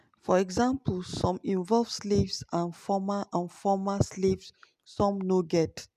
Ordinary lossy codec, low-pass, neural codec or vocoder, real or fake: none; 14.4 kHz; none; real